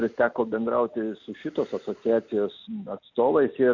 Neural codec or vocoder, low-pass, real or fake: none; 7.2 kHz; real